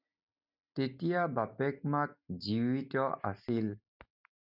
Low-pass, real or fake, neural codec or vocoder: 5.4 kHz; real; none